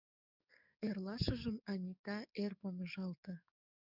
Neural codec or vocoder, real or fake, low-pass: codec, 44.1 kHz, 7.8 kbps, DAC; fake; 5.4 kHz